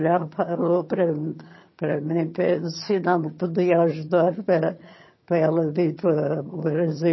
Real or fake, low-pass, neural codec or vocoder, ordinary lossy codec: fake; 7.2 kHz; vocoder, 22.05 kHz, 80 mel bands, HiFi-GAN; MP3, 24 kbps